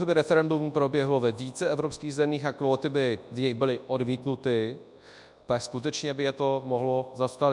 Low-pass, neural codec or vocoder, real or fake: 10.8 kHz; codec, 24 kHz, 0.9 kbps, WavTokenizer, large speech release; fake